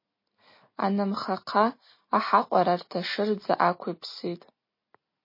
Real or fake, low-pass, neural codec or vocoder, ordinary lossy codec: real; 5.4 kHz; none; MP3, 24 kbps